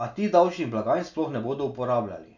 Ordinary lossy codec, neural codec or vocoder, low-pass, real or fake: none; none; 7.2 kHz; real